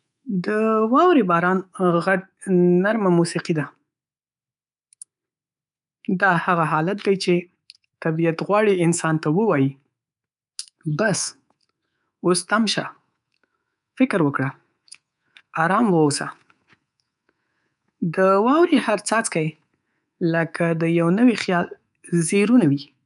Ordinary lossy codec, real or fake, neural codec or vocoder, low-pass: none; fake; codec, 24 kHz, 3.1 kbps, DualCodec; 10.8 kHz